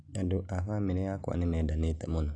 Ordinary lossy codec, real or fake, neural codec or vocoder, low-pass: none; real; none; 9.9 kHz